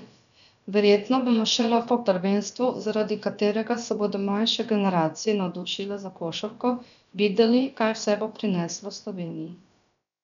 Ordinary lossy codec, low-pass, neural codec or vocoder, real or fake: none; 7.2 kHz; codec, 16 kHz, about 1 kbps, DyCAST, with the encoder's durations; fake